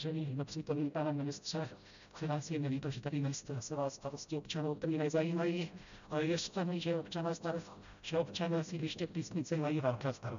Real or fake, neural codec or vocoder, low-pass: fake; codec, 16 kHz, 0.5 kbps, FreqCodec, smaller model; 7.2 kHz